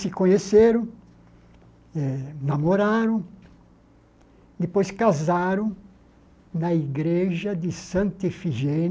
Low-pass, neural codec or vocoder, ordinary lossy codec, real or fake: none; none; none; real